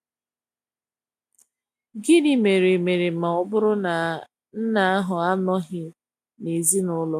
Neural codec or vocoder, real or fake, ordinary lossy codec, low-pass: none; real; none; 14.4 kHz